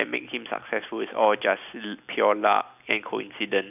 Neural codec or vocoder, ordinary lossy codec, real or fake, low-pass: none; none; real; 3.6 kHz